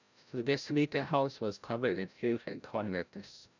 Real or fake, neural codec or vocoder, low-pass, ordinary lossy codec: fake; codec, 16 kHz, 0.5 kbps, FreqCodec, larger model; 7.2 kHz; none